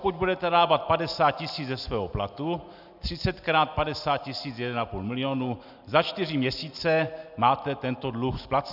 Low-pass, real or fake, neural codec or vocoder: 5.4 kHz; real; none